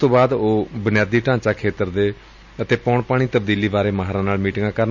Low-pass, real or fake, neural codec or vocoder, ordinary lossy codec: 7.2 kHz; real; none; none